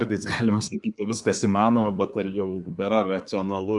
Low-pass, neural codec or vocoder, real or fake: 10.8 kHz; codec, 24 kHz, 1 kbps, SNAC; fake